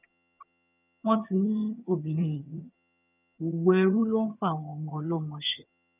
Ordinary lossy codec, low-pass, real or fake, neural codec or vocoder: none; 3.6 kHz; fake; vocoder, 22.05 kHz, 80 mel bands, HiFi-GAN